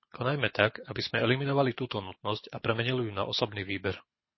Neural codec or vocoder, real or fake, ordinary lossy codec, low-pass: codec, 24 kHz, 6 kbps, HILCodec; fake; MP3, 24 kbps; 7.2 kHz